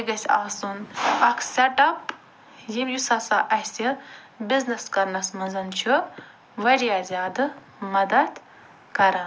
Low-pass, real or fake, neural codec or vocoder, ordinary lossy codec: none; real; none; none